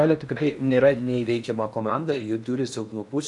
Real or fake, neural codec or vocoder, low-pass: fake; codec, 16 kHz in and 24 kHz out, 0.8 kbps, FocalCodec, streaming, 65536 codes; 10.8 kHz